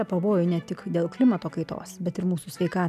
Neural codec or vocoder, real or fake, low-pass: none; real; 14.4 kHz